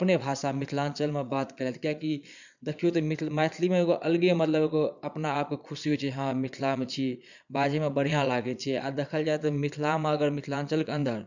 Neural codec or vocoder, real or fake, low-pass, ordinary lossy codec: vocoder, 22.05 kHz, 80 mel bands, Vocos; fake; 7.2 kHz; none